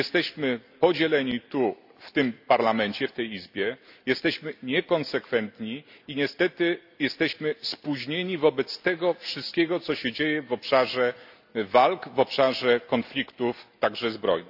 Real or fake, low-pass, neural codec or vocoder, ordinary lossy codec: real; 5.4 kHz; none; AAC, 48 kbps